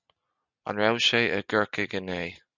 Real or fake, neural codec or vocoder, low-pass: real; none; 7.2 kHz